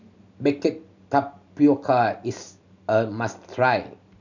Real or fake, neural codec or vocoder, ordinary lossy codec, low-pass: real; none; none; 7.2 kHz